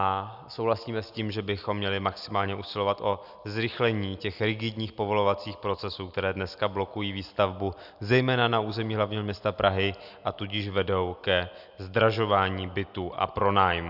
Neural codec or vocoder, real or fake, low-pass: none; real; 5.4 kHz